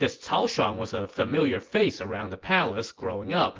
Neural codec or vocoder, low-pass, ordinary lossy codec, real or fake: vocoder, 24 kHz, 100 mel bands, Vocos; 7.2 kHz; Opus, 16 kbps; fake